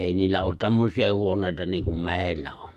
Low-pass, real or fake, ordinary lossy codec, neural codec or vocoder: 14.4 kHz; fake; none; codec, 44.1 kHz, 2.6 kbps, SNAC